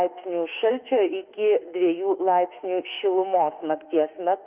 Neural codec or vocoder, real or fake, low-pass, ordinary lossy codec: autoencoder, 48 kHz, 32 numbers a frame, DAC-VAE, trained on Japanese speech; fake; 3.6 kHz; Opus, 24 kbps